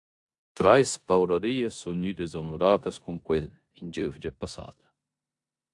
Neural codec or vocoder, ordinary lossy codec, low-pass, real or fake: codec, 16 kHz in and 24 kHz out, 0.9 kbps, LongCat-Audio-Codec, four codebook decoder; AAC, 64 kbps; 10.8 kHz; fake